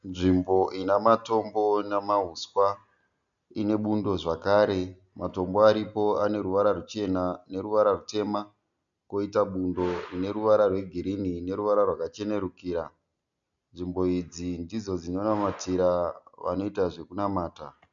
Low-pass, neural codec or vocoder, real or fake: 7.2 kHz; none; real